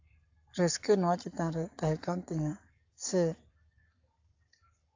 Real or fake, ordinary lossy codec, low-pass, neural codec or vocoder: fake; AAC, 48 kbps; 7.2 kHz; codec, 44.1 kHz, 7.8 kbps, Pupu-Codec